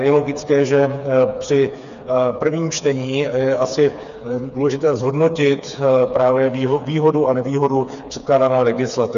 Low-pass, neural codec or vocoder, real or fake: 7.2 kHz; codec, 16 kHz, 4 kbps, FreqCodec, smaller model; fake